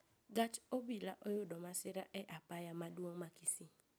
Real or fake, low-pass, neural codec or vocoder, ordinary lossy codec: real; none; none; none